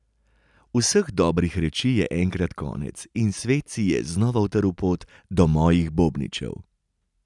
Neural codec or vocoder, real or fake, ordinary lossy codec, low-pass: none; real; none; 10.8 kHz